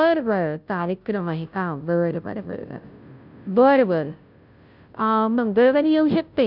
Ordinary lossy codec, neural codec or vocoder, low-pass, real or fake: none; codec, 16 kHz, 0.5 kbps, FunCodec, trained on Chinese and English, 25 frames a second; 5.4 kHz; fake